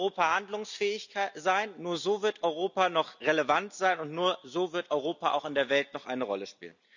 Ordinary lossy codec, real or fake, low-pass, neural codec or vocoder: none; real; 7.2 kHz; none